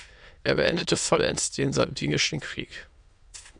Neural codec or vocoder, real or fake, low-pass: autoencoder, 22.05 kHz, a latent of 192 numbers a frame, VITS, trained on many speakers; fake; 9.9 kHz